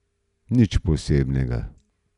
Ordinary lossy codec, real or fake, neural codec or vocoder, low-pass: none; real; none; 10.8 kHz